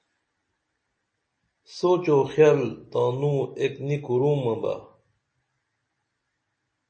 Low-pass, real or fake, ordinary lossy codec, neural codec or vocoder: 9.9 kHz; real; MP3, 32 kbps; none